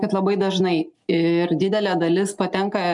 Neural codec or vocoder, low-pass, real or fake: none; 10.8 kHz; real